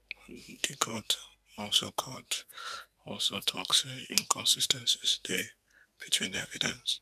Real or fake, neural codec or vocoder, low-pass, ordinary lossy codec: fake; codec, 44.1 kHz, 2.6 kbps, SNAC; 14.4 kHz; none